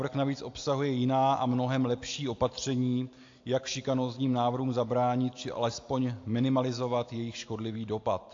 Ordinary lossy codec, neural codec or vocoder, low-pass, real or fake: AAC, 48 kbps; none; 7.2 kHz; real